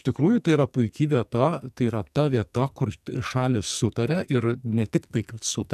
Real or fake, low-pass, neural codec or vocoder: fake; 14.4 kHz; codec, 44.1 kHz, 2.6 kbps, SNAC